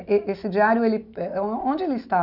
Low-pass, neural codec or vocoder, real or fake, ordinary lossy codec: 5.4 kHz; none; real; none